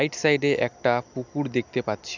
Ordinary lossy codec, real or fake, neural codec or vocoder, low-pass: none; real; none; 7.2 kHz